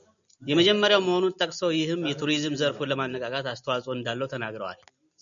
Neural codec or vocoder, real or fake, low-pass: none; real; 7.2 kHz